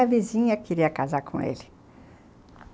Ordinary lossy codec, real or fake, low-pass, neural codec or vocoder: none; real; none; none